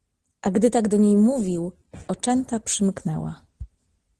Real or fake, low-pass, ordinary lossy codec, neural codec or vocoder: real; 10.8 kHz; Opus, 16 kbps; none